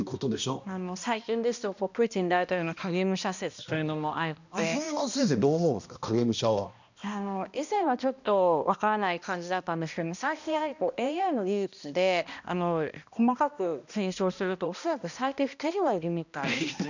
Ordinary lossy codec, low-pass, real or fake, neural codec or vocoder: none; 7.2 kHz; fake; codec, 16 kHz, 1 kbps, X-Codec, HuBERT features, trained on balanced general audio